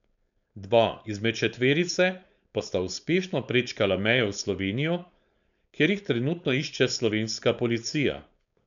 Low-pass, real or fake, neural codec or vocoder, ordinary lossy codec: 7.2 kHz; fake; codec, 16 kHz, 4.8 kbps, FACodec; none